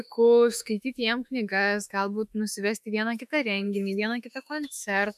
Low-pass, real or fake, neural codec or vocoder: 14.4 kHz; fake; autoencoder, 48 kHz, 32 numbers a frame, DAC-VAE, trained on Japanese speech